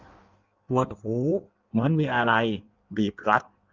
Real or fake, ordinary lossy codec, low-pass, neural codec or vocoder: fake; Opus, 32 kbps; 7.2 kHz; codec, 16 kHz in and 24 kHz out, 1.1 kbps, FireRedTTS-2 codec